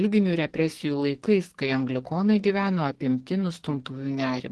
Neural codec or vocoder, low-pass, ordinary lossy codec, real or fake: codec, 44.1 kHz, 2.6 kbps, SNAC; 10.8 kHz; Opus, 16 kbps; fake